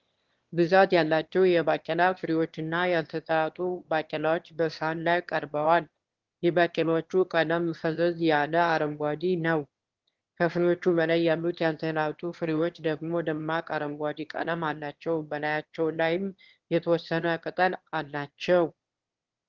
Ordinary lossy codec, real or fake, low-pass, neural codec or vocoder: Opus, 16 kbps; fake; 7.2 kHz; autoencoder, 22.05 kHz, a latent of 192 numbers a frame, VITS, trained on one speaker